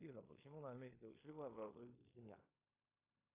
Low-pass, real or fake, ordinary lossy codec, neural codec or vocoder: 3.6 kHz; fake; AAC, 16 kbps; codec, 16 kHz in and 24 kHz out, 0.9 kbps, LongCat-Audio-Codec, fine tuned four codebook decoder